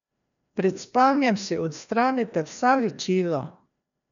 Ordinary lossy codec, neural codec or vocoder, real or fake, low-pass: none; codec, 16 kHz, 1 kbps, FreqCodec, larger model; fake; 7.2 kHz